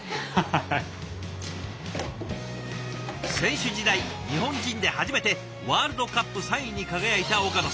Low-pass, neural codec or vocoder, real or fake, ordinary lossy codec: none; none; real; none